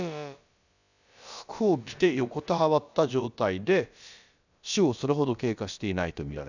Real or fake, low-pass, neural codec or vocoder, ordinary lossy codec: fake; 7.2 kHz; codec, 16 kHz, about 1 kbps, DyCAST, with the encoder's durations; none